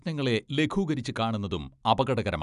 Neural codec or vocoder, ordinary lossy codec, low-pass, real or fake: none; none; 10.8 kHz; real